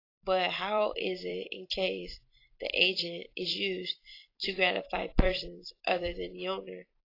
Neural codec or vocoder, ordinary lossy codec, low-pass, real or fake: vocoder, 44.1 kHz, 128 mel bands every 256 samples, BigVGAN v2; AAC, 32 kbps; 5.4 kHz; fake